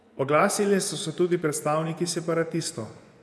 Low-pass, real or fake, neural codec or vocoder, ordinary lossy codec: none; fake; vocoder, 24 kHz, 100 mel bands, Vocos; none